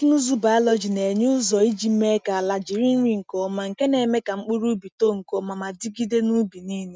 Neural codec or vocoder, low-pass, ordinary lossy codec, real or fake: none; none; none; real